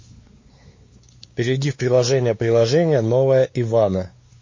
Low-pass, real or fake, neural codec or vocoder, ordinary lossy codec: 7.2 kHz; fake; codec, 16 kHz, 4 kbps, X-Codec, WavLM features, trained on Multilingual LibriSpeech; MP3, 32 kbps